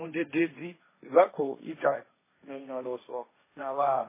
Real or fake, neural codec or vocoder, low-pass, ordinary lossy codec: fake; codec, 16 kHz, 1.1 kbps, Voila-Tokenizer; 3.6 kHz; MP3, 16 kbps